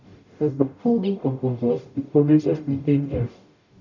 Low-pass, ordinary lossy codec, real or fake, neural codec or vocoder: 7.2 kHz; none; fake; codec, 44.1 kHz, 0.9 kbps, DAC